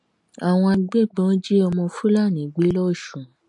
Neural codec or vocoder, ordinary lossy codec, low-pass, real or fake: none; MP3, 48 kbps; 10.8 kHz; real